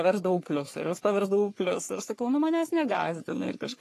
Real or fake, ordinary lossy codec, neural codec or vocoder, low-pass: fake; AAC, 48 kbps; codec, 44.1 kHz, 3.4 kbps, Pupu-Codec; 14.4 kHz